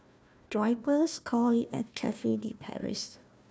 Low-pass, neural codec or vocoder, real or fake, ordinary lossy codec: none; codec, 16 kHz, 1 kbps, FunCodec, trained on Chinese and English, 50 frames a second; fake; none